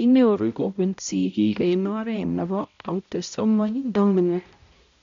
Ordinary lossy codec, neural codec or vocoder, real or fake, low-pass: MP3, 64 kbps; codec, 16 kHz, 0.5 kbps, X-Codec, HuBERT features, trained on balanced general audio; fake; 7.2 kHz